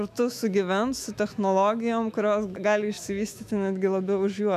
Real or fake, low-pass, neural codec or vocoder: fake; 14.4 kHz; autoencoder, 48 kHz, 128 numbers a frame, DAC-VAE, trained on Japanese speech